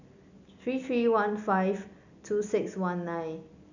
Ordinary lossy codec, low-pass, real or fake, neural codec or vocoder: none; 7.2 kHz; real; none